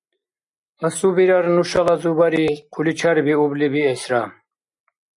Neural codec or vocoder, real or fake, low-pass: none; real; 10.8 kHz